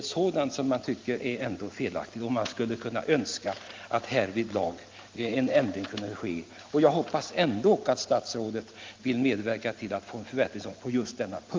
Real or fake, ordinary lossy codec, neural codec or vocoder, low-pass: real; Opus, 32 kbps; none; 7.2 kHz